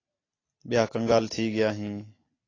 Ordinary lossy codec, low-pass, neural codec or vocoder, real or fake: AAC, 32 kbps; 7.2 kHz; none; real